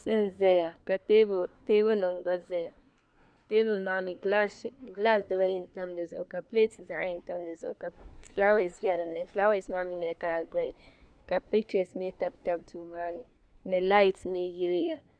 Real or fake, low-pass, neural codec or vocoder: fake; 9.9 kHz; codec, 24 kHz, 1 kbps, SNAC